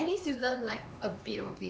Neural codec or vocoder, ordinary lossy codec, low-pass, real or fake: codec, 16 kHz, 2 kbps, X-Codec, HuBERT features, trained on LibriSpeech; none; none; fake